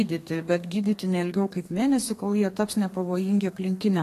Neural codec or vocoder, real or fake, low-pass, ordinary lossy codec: codec, 32 kHz, 1.9 kbps, SNAC; fake; 14.4 kHz; AAC, 48 kbps